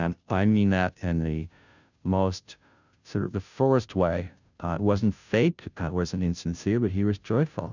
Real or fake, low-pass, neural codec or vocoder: fake; 7.2 kHz; codec, 16 kHz, 0.5 kbps, FunCodec, trained on Chinese and English, 25 frames a second